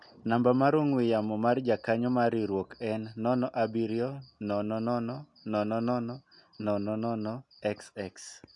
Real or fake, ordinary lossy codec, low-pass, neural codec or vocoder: real; MP3, 64 kbps; 10.8 kHz; none